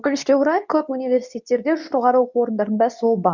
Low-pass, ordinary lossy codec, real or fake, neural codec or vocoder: 7.2 kHz; none; fake; codec, 24 kHz, 0.9 kbps, WavTokenizer, medium speech release version 2